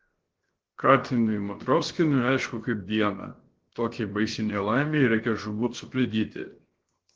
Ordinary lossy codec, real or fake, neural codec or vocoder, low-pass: Opus, 16 kbps; fake; codec, 16 kHz, 0.7 kbps, FocalCodec; 7.2 kHz